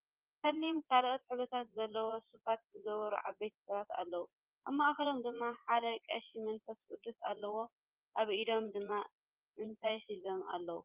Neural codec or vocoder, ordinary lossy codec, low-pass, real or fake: vocoder, 22.05 kHz, 80 mel bands, Vocos; Opus, 32 kbps; 3.6 kHz; fake